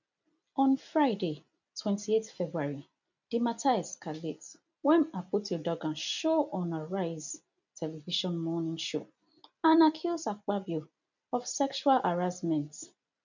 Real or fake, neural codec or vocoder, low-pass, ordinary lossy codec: real; none; 7.2 kHz; none